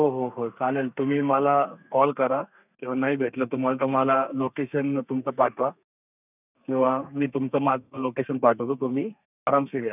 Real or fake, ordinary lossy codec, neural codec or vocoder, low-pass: fake; none; codec, 32 kHz, 1.9 kbps, SNAC; 3.6 kHz